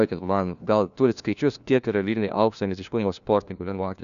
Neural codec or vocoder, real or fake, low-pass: codec, 16 kHz, 1 kbps, FunCodec, trained on LibriTTS, 50 frames a second; fake; 7.2 kHz